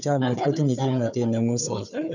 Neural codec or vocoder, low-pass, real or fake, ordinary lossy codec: codec, 16 kHz, 4 kbps, FunCodec, trained on Chinese and English, 50 frames a second; 7.2 kHz; fake; none